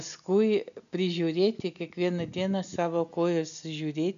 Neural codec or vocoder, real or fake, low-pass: none; real; 7.2 kHz